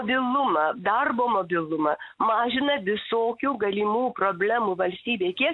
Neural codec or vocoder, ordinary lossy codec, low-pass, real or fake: none; MP3, 96 kbps; 10.8 kHz; real